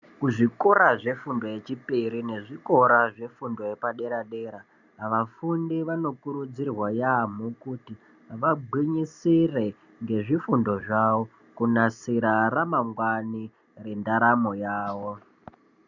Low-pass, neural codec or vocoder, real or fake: 7.2 kHz; none; real